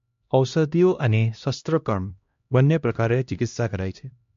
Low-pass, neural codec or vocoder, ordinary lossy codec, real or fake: 7.2 kHz; codec, 16 kHz, 1 kbps, X-Codec, HuBERT features, trained on LibriSpeech; MP3, 48 kbps; fake